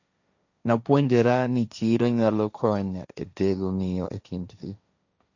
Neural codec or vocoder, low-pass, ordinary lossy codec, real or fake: codec, 16 kHz, 1.1 kbps, Voila-Tokenizer; 7.2 kHz; none; fake